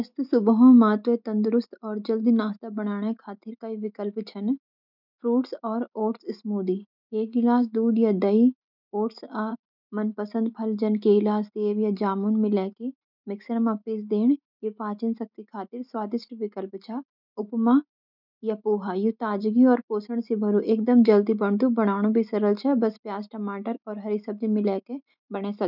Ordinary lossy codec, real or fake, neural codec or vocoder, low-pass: none; real; none; 5.4 kHz